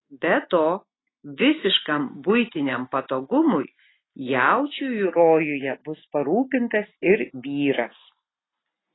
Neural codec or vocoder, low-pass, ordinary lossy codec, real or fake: none; 7.2 kHz; AAC, 16 kbps; real